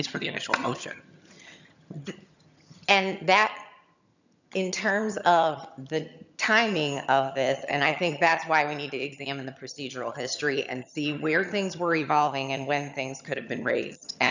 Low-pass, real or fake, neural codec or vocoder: 7.2 kHz; fake; vocoder, 22.05 kHz, 80 mel bands, HiFi-GAN